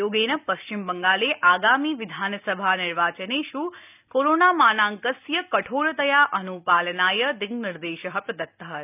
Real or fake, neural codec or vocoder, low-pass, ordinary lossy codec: real; none; 3.6 kHz; none